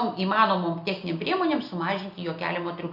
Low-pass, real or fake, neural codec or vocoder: 5.4 kHz; real; none